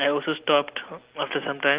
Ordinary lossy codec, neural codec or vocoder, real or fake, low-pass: Opus, 16 kbps; none; real; 3.6 kHz